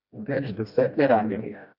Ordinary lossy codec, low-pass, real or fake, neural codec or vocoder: none; 5.4 kHz; fake; codec, 16 kHz, 1 kbps, FreqCodec, smaller model